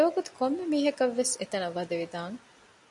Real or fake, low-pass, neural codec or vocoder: real; 10.8 kHz; none